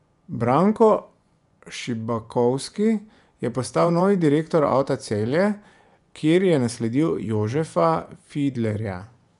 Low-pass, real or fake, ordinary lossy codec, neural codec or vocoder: 10.8 kHz; fake; none; vocoder, 24 kHz, 100 mel bands, Vocos